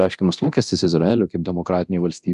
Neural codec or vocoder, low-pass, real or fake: codec, 24 kHz, 0.9 kbps, DualCodec; 10.8 kHz; fake